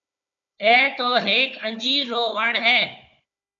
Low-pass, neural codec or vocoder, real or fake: 7.2 kHz; codec, 16 kHz, 4 kbps, FunCodec, trained on Chinese and English, 50 frames a second; fake